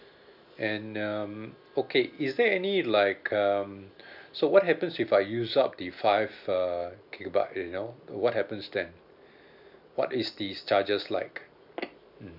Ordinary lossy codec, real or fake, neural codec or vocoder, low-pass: none; real; none; 5.4 kHz